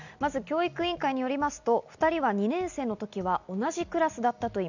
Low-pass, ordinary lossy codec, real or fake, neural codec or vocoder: 7.2 kHz; none; real; none